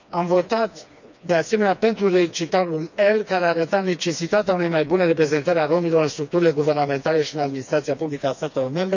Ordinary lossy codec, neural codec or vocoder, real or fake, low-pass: none; codec, 16 kHz, 2 kbps, FreqCodec, smaller model; fake; 7.2 kHz